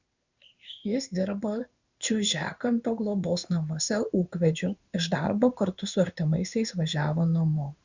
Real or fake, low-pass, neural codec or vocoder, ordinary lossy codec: fake; 7.2 kHz; codec, 16 kHz in and 24 kHz out, 1 kbps, XY-Tokenizer; Opus, 64 kbps